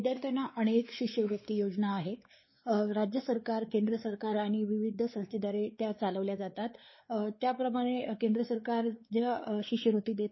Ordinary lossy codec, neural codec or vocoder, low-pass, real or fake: MP3, 24 kbps; codec, 16 kHz, 4 kbps, X-Codec, WavLM features, trained on Multilingual LibriSpeech; 7.2 kHz; fake